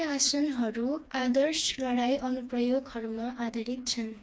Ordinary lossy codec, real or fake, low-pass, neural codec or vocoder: none; fake; none; codec, 16 kHz, 2 kbps, FreqCodec, smaller model